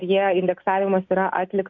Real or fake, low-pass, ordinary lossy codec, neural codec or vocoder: real; 7.2 kHz; MP3, 48 kbps; none